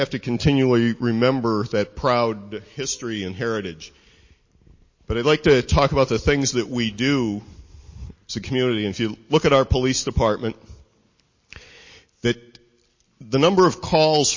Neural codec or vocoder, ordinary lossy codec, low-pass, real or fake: none; MP3, 32 kbps; 7.2 kHz; real